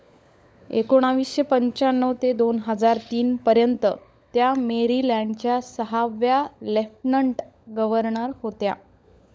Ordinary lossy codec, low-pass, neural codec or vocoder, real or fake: none; none; codec, 16 kHz, 16 kbps, FunCodec, trained on LibriTTS, 50 frames a second; fake